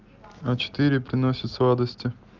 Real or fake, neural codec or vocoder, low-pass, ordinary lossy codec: real; none; 7.2 kHz; Opus, 32 kbps